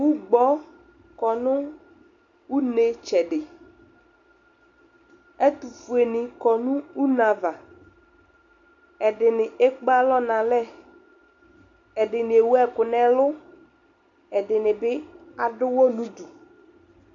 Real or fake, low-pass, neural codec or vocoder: real; 7.2 kHz; none